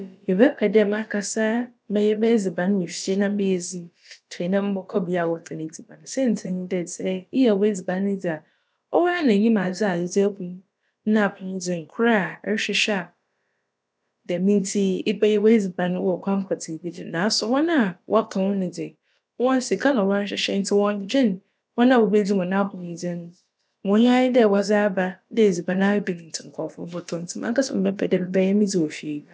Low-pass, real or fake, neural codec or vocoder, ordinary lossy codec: none; fake; codec, 16 kHz, about 1 kbps, DyCAST, with the encoder's durations; none